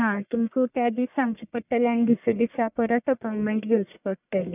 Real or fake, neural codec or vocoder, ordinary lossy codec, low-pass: fake; codec, 44.1 kHz, 1.7 kbps, Pupu-Codec; none; 3.6 kHz